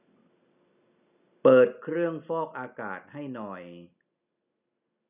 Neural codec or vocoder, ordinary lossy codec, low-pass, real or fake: none; none; 3.6 kHz; real